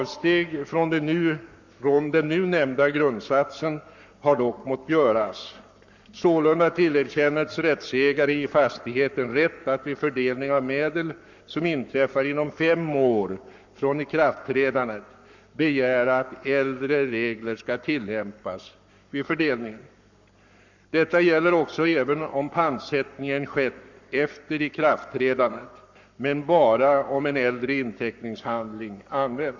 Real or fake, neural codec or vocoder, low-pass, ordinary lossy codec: fake; codec, 44.1 kHz, 7.8 kbps, Pupu-Codec; 7.2 kHz; none